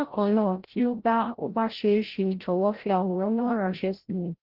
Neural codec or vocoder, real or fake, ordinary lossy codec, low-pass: codec, 16 kHz, 0.5 kbps, FreqCodec, larger model; fake; Opus, 16 kbps; 5.4 kHz